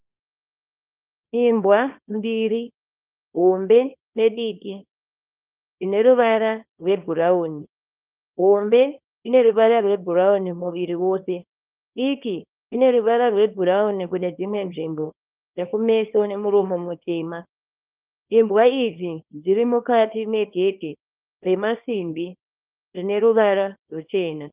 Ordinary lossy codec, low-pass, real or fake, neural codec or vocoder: Opus, 24 kbps; 3.6 kHz; fake; codec, 24 kHz, 0.9 kbps, WavTokenizer, small release